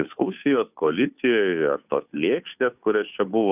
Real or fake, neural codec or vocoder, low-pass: fake; codec, 16 kHz, 2 kbps, FunCodec, trained on Chinese and English, 25 frames a second; 3.6 kHz